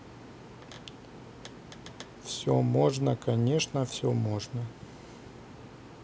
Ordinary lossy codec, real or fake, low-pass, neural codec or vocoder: none; real; none; none